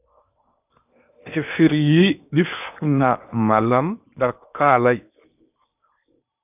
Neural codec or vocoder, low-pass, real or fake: codec, 16 kHz in and 24 kHz out, 0.8 kbps, FocalCodec, streaming, 65536 codes; 3.6 kHz; fake